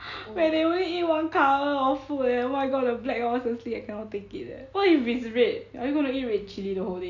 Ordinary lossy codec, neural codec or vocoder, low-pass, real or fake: AAC, 48 kbps; none; 7.2 kHz; real